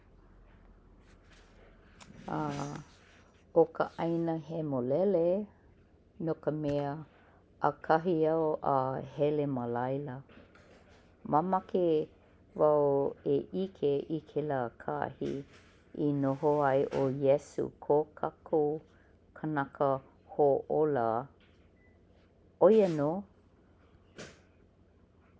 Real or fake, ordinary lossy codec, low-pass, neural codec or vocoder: real; none; none; none